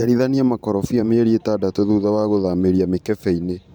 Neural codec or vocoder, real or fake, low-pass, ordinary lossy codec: vocoder, 44.1 kHz, 128 mel bands every 512 samples, BigVGAN v2; fake; none; none